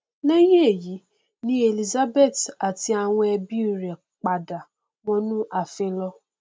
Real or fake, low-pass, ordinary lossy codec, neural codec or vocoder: real; none; none; none